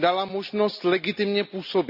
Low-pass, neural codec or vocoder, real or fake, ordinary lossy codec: 5.4 kHz; none; real; none